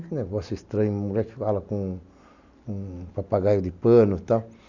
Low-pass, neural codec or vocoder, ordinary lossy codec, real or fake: 7.2 kHz; none; none; real